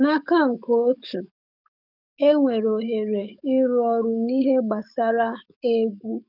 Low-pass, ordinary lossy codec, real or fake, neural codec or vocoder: 5.4 kHz; none; fake; codec, 44.1 kHz, 7.8 kbps, DAC